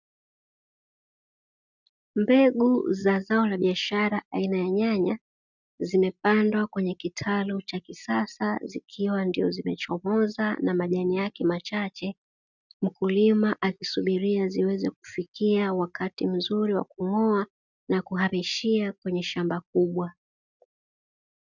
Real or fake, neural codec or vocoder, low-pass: real; none; 7.2 kHz